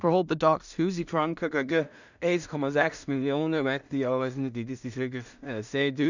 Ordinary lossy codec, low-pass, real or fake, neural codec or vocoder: none; 7.2 kHz; fake; codec, 16 kHz in and 24 kHz out, 0.4 kbps, LongCat-Audio-Codec, two codebook decoder